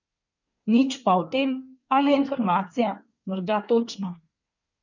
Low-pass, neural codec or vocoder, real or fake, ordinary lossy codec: 7.2 kHz; codec, 24 kHz, 1 kbps, SNAC; fake; none